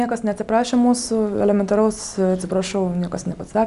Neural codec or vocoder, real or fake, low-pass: none; real; 10.8 kHz